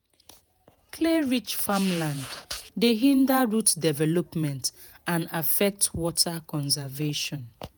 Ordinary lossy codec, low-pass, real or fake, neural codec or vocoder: none; none; fake; vocoder, 48 kHz, 128 mel bands, Vocos